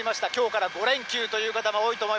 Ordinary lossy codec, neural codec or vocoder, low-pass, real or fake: none; none; none; real